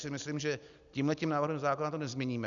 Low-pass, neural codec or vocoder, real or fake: 7.2 kHz; none; real